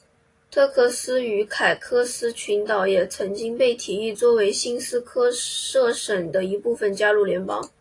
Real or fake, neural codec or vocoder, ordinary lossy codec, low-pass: real; none; AAC, 48 kbps; 10.8 kHz